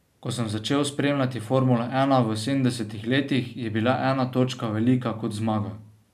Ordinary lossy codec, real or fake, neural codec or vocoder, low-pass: none; real; none; 14.4 kHz